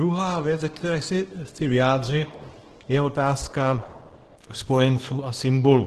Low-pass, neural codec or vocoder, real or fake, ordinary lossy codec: 10.8 kHz; codec, 24 kHz, 0.9 kbps, WavTokenizer, small release; fake; Opus, 16 kbps